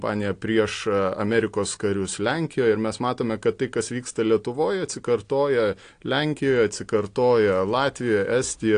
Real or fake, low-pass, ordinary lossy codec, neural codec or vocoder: real; 9.9 kHz; AAC, 48 kbps; none